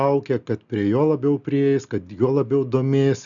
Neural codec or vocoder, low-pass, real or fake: none; 7.2 kHz; real